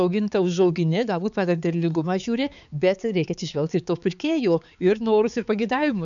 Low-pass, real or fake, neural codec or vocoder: 7.2 kHz; fake; codec, 16 kHz, 4 kbps, X-Codec, HuBERT features, trained on balanced general audio